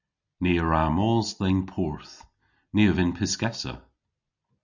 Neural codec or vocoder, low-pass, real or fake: none; 7.2 kHz; real